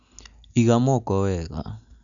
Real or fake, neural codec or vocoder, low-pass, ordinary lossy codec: real; none; 7.2 kHz; none